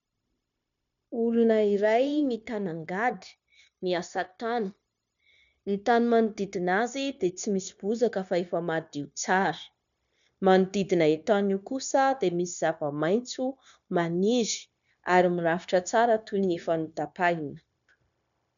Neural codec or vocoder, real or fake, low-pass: codec, 16 kHz, 0.9 kbps, LongCat-Audio-Codec; fake; 7.2 kHz